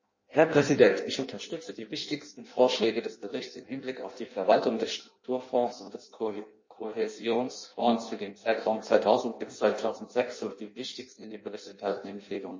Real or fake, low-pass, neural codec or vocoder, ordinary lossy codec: fake; 7.2 kHz; codec, 16 kHz in and 24 kHz out, 0.6 kbps, FireRedTTS-2 codec; MP3, 32 kbps